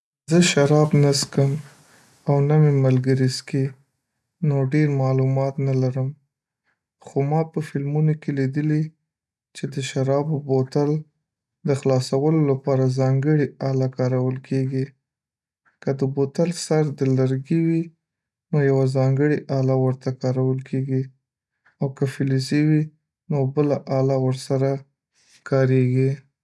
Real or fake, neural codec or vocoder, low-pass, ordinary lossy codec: real; none; none; none